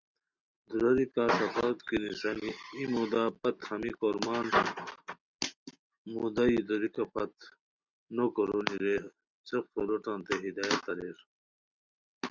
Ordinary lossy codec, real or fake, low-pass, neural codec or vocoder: Opus, 64 kbps; real; 7.2 kHz; none